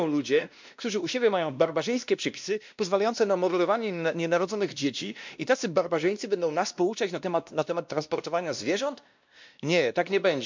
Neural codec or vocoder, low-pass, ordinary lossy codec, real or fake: codec, 16 kHz, 1 kbps, X-Codec, WavLM features, trained on Multilingual LibriSpeech; 7.2 kHz; MP3, 64 kbps; fake